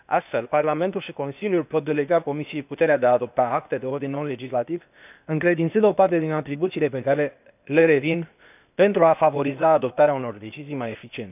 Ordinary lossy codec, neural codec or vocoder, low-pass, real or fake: none; codec, 16 kHz, 0.8 kbps, ZipCodec; 3.6 kHz; fake